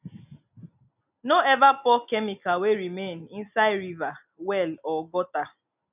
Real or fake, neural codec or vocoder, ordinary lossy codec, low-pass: real; none; none; 3.6 kHz